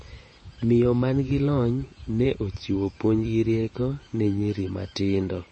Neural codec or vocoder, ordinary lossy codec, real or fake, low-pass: vocoder, 48 kHz, 128 mel bands, Vocos; MP3, 32 kbps; fake; 9.9 kHz